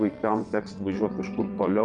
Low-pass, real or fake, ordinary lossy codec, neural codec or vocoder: 9.9 kHz; fake; Opus, 64 kbps; vocoder, 22.05 kHz, 80 mel bands, WaveNeXt